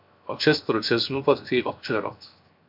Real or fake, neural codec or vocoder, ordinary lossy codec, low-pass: fake; codec, 16 kHz, 0.7 kbps, FocalCodec; MP3, 48 kbps; 5.4 kHz